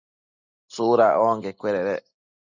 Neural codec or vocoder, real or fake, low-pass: none; real; 7.2 kHz